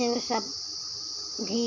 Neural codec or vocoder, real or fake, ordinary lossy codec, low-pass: none; real; none; 7.2 kHz